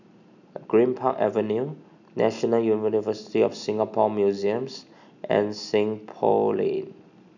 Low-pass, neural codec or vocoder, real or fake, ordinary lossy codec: 7.2 kHz; none; real; none